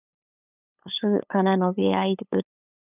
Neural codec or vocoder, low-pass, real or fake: codec, 16 kHz, 8 kbps, FunCodec, trained on LibriTTS, 25 frames a second; 3.6 kHz; fake